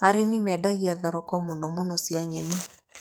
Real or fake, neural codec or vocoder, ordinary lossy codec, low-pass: fake; codec, 44.1 kHz, 2.6 kbps, SNAC; none; none